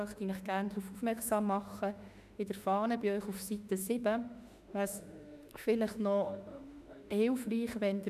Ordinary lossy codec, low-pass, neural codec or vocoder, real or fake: none; 14.4 kHz; autoencoder, 48 kHz, 32 numbers a frame, DAC-VAE, trained on Japanese speech; fake